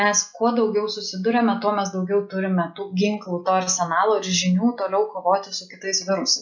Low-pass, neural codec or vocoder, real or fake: 7.2 kHz; none; real